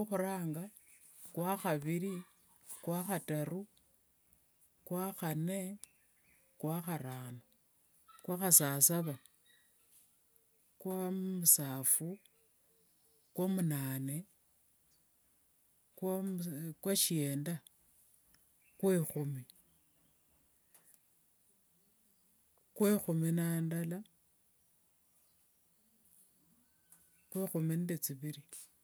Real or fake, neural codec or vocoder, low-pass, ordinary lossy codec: real; none; none; none